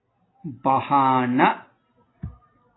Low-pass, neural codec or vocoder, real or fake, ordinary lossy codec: 7.2 kHz; none; real; AAC, 16 kbps